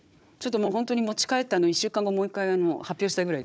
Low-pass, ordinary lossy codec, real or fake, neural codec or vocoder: none; none; fake; codec, 16 kHz, 4 kbps, FunCodec, trained on Chinese and English, 50 frames a second